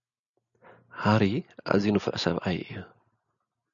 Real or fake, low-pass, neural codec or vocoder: real; 7.2 kHz; none